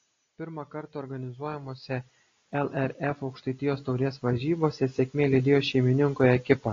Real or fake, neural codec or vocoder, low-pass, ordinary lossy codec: real; none; 7.2 kHz; AAC, 32 kbps